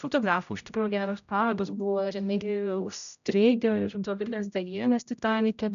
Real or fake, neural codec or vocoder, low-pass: fake; codec, 16 kHz, 0.5 kbps, X-Codec, HuBERT features, trained on general audio; 7.2 kHz